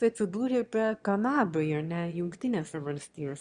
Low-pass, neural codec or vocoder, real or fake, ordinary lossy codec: 9.9 kHz; autoencoder, 22.05 kHz, a latent of 192 numbers a frame, VITS, trained on one speaker; fake; Opus, 64 kbps